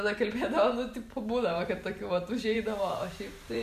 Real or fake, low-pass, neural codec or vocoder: fake; 14.4 kHz; vocoder, 44.1 kHz, 128 mel bands every 512 samples, BigVGAN v2